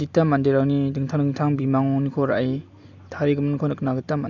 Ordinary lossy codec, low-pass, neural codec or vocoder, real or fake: none; 7.2 kHz; none; real